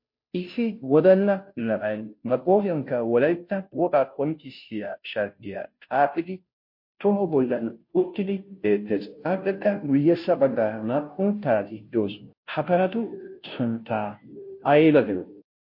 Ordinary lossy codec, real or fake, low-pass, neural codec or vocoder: MP3, 32 kbps; fake; 5.4 kHz; codec, 16 kHz, 0.5 kbps, FunCodec, trained on Chinese and English, 25 frames a second